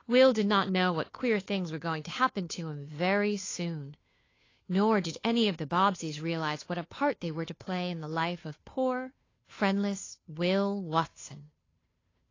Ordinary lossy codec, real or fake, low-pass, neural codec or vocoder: AAC, 32 kbps; fake; 7.2 kHz; codec, 16 kHz, 2 kbps, FunCodec, trained on Chinese and English, 25 frames a second